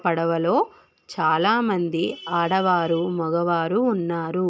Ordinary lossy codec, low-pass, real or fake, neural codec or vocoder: none; none; real; none